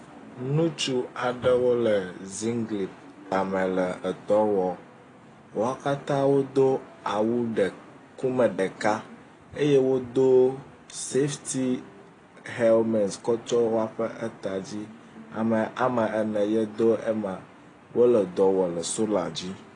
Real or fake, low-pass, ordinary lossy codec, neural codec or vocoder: real; 9.9 kHz; AAC, 32 kbps; none